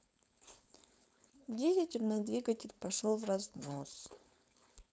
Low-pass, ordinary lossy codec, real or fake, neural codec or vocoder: none; none; fake; codec, 16 kHz, 4.8 kbps, FACodec